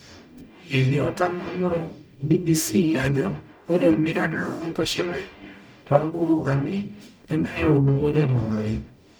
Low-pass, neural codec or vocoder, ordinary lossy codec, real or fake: none; codec, 44.1 kHz, 0.9 kbps, DAC; none; fake